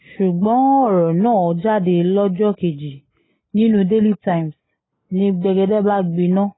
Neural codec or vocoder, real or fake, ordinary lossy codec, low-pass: none; real; AAC, 16 kbps; 7.2 kHz